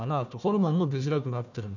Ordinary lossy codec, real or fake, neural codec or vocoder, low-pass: none; fake; codec, 16 kHz, 1 kbps, FunCodec, trained on Chinese and English, 50 frames a second; 7.2 kHz